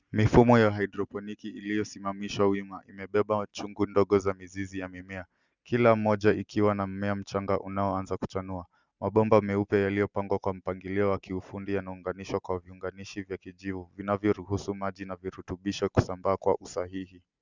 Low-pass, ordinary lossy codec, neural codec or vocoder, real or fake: 7.2 kHz; Opus, 64 kbps; none; real